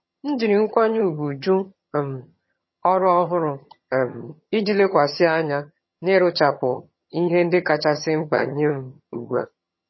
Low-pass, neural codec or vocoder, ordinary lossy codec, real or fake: 7.2 kHz; vocoder, 22.05 kHz, 80 mel bands, HiFi-GAN; MP3, 24 kbps; fake